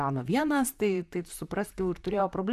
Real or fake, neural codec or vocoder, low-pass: fake; vocoder, 44.1 kHz, 128 mel bands, Pupu-Vocoder; 14.4 kHz